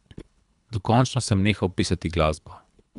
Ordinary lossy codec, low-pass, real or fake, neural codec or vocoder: none; 10.8 kHz; fake; codec, 24 kHz, 3 kbps, HILCodec